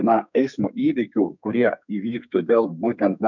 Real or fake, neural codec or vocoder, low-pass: fake; codec, 32 kHz, 1.9 kbps, SNAC; 7.2 kHz